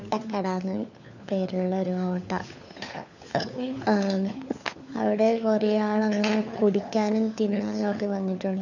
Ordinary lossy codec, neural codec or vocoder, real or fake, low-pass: none; codec, 16 kHz, 4 kbps, FunCodec, trained on LibriTTS, 50 frames a second; fake; 7.2 kHz